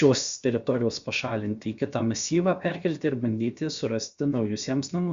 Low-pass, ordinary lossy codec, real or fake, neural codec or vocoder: 7.2 kHz; Opus, 64 kbps; fake; codec, 16 kHz, about 1 kbps, DyCAST, with the encoder's durations